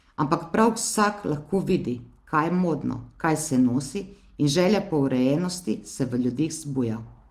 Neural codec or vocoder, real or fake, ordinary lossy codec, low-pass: none; real; Opus, 24 kbps; 14.4 kHz